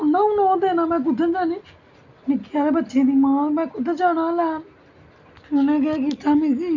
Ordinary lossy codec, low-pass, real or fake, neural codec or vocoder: none; 7.2 kHz; real; none